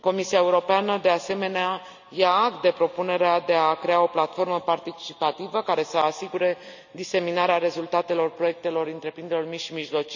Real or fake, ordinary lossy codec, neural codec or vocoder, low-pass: real; none; none; 7.2 kHz